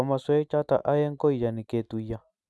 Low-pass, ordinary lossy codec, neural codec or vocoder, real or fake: none; none; none; real